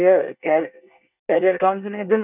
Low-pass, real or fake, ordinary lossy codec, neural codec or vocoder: 3.6 kHz; fake; none; codec, 24 kHz, 1 kbps, SNAC